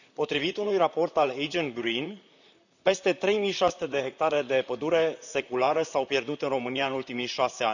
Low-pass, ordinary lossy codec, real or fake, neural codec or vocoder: 7.2 kHz; none; fake; vocoder, 44.1 kHz, 128 mel bands, Pupu-Vocoder